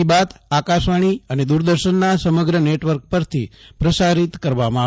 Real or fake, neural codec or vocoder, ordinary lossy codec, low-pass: real; none; none; none